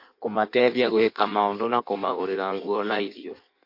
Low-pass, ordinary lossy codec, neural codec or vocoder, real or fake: 5.4 kHz; MP3, 32 kbps; codec, 16 kHz in and 24 kHz out, 1.1 kbps, FireRedTTS-2 codec; fake